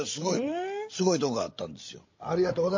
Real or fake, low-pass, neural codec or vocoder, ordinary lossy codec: fake; 7.2 kHz; codec, 16 kHz, 16 kbps, FreqCodec, larger model; MP3, 32 kbps